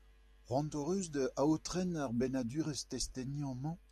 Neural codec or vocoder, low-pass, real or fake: none; 14.4 kHz; real